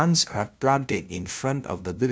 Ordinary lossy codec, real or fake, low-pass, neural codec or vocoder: none; fake; none; codec, 16 kHz, 0.5 kbps, FunCodec, trained on LibriTTS, 25 frames a second